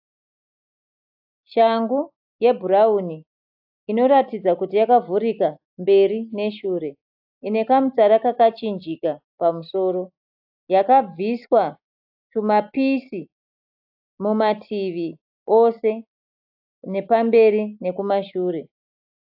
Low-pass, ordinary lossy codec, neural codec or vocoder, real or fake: 5.4 kHz; AAC, 48 kbps; none; real